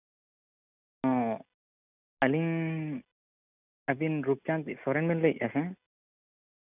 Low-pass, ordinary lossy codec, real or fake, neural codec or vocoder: 3.6 kHz; none; real; none